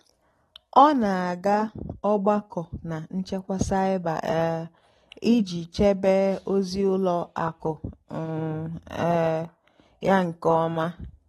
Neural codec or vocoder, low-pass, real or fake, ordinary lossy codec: none; 19.8 kHz; real; AAC, 32 kbps